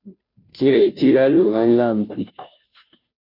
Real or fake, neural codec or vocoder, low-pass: fake; codec, 16 kHz, 0.5 kbps, FunCodec, trained on Chinese and English, 25 frames a second; 5.4 kHz